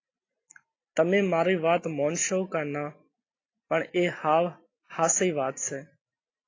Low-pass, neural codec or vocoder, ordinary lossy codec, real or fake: 7.2 kHz; none; AAC, 32 kbps; real